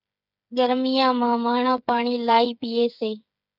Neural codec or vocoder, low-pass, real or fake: codec, 16 kHz, 8 kbps, FreqCodec, smaller model; 5.4 kHz; fake